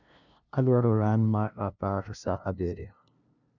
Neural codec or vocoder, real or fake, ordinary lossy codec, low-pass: codec, 16 kHz, 0.5 kbps, FunCodec, trained on LibriTTS, 25 frames a second; fake; none; 7.2 kHz